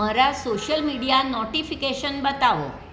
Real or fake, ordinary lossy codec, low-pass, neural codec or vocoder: real; none; none; none